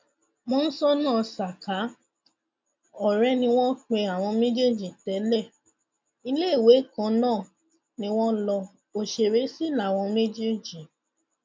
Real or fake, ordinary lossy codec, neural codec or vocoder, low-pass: real; none; none; none